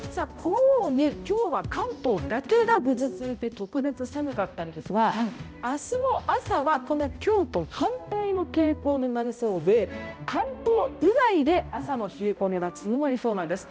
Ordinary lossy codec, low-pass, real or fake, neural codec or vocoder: none; none; fake; codec, 16 kHz, 0.5 kbps, X-Codec, HuBERT features, trained on balanced general audio